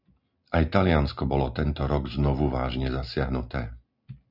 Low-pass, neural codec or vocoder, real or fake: 5.4 kHz; none; real